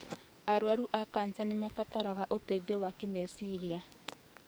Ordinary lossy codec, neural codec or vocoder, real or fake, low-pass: none; codec, 44.1 kHz, 2.6 kbps, SNAC; fake; none